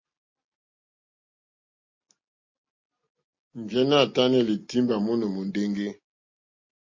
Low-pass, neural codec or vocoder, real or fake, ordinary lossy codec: 7.2 kHz; none; real; MP3, 32 kbps